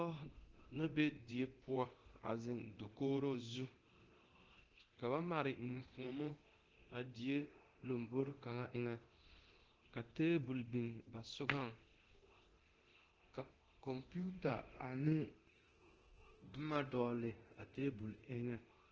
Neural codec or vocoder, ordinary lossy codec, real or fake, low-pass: codec, 24 kHz, 0.9 kbps, DualCodec; Opus, 16 kbps; fake; 7.2 kHz